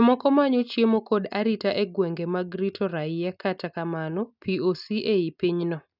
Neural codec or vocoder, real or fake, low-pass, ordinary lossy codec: none; real; 5.4 kHz; none